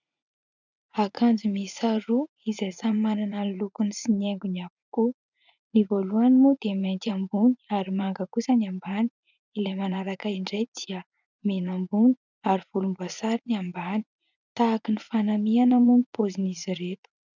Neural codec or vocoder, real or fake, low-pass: vocoder, 44.1 kHz, 80 mel bands, Vocos; fake; 7.2 kHz